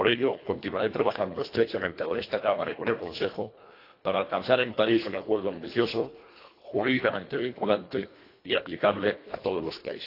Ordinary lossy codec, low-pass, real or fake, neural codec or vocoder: AAC, 32 kbps; 5.4 kHz; fake; codec, 24 kHz, 1.5 kbps, HILCodec